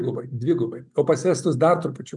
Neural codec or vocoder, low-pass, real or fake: none; 10.8 kHz; real